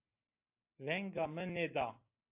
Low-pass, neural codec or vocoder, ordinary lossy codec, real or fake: 3.6 kHz; none; AAC, 24 kbps; real